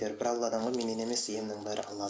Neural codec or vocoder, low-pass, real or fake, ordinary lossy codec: none; none; real; none